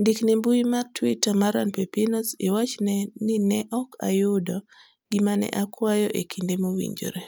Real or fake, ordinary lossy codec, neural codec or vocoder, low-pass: real; none; none; none